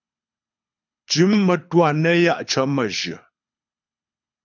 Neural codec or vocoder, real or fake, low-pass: codec, 24 kHz, 6 kbps, HILCodec; fake; 7.2 kHz